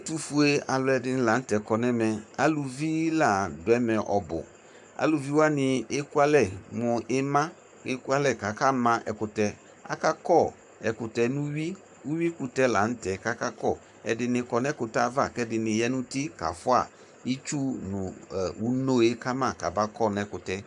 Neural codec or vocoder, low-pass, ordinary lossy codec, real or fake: codec, 44.1 kHz, 7.8 kbps, Pupu-Codec; 10.8 kHz; MP3, 96 kbps; fake